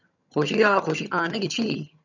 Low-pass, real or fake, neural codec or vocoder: 7.2 kHz; fake; vocoder, 22.05 kHz, 80 mel bands, HiFi-GAN